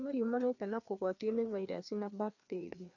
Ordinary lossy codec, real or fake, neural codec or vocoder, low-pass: none; fake; codec, 16 kHz, 2 kbps, FreqCodec, larger model; 7.2 kHz